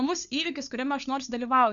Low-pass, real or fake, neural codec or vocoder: 7.2 kHz; fake; codec, 16 kHz, 4 kbps, FunCodec, trained on LibriTTS, 50 frames a second